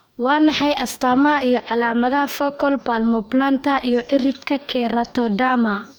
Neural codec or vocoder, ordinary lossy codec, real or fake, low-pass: codec, 44.1 kHz, 2.6 kbps, DAC; none; fake; none